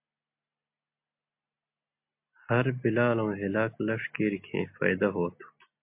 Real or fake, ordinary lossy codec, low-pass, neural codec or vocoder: real; MP3, 32 kbps; 3.6 kHz; none